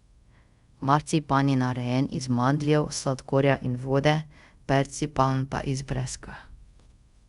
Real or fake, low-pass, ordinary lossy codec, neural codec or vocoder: fake; 10.8 kHz; Opus, 64 kbps; codec, 24 kHz, 0.5 kbps, DualCodec